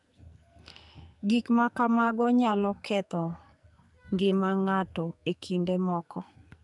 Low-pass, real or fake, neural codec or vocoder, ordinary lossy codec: 10.8 kHz; fake; codec, 44.1 kHz, 2.6 kbps, SNAC; none